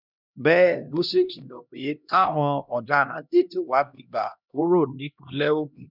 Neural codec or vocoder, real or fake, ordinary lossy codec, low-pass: codec, 16 kHz, 1 kbps, X-Codec, HuBERT features, trained on LibriSpeech; fake; none; 5.4 kHz